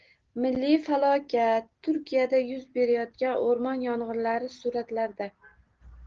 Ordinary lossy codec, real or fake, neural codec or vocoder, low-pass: Opus, 16 kbps; real; none; 7.2 kHz